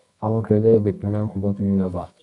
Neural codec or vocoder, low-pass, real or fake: codec, 24 kHz, 0.9 kbps, WavTokenizer, medium music audio release; 10.8 kHz; fake